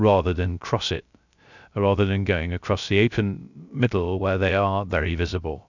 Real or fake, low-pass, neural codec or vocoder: fake; 7.2 kHz; codec, 16 kHz, 0.3 kbps, FocalCodec